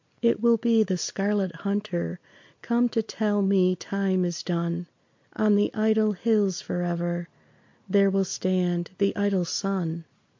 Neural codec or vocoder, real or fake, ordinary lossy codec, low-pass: none; real; MP3, 48 kbps; 7.2 kHz